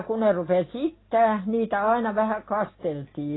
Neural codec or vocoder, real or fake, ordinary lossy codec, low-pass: none; real; AAC, 16 kbps; 7.2 kHz